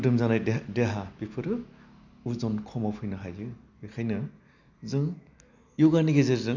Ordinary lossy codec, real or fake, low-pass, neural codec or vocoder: none; real; 7.2 kHz; none